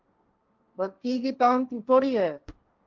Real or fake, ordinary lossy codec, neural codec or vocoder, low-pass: fake; Opus, 32 kbps; codec, 16 kHz, 1.1 kbps, Voila-Tokenizer; 7.2 kHz